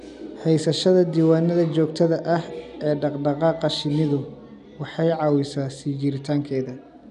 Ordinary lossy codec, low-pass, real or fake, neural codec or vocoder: none; 10.8 kHz; real; none